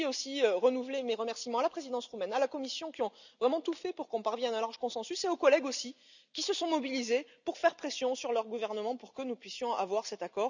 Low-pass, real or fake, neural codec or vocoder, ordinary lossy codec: 7.2 kHz; real; none; none